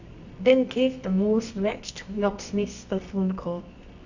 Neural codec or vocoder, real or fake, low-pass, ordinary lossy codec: codec, 24 kHz, 0.9 kbps, WavTokenizer, medium music audio release; fake; 7.2 kHz; none